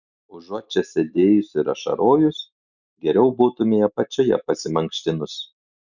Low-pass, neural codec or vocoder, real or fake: 7.2 kHz; none; real